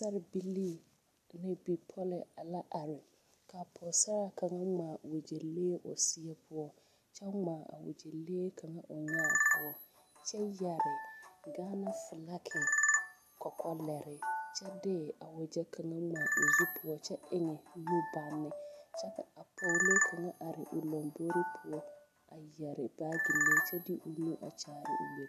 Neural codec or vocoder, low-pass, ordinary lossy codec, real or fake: none; 14.4 kHz; AAC, 96 kbps; real